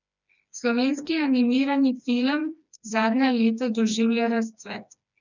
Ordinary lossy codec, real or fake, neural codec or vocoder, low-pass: none; fake; codec, 16 kHz, 2 kbps, FreqCodec, smaller model; 7.2 kHz